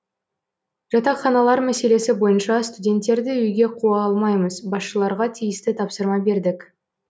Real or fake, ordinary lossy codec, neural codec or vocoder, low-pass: real; none; none; none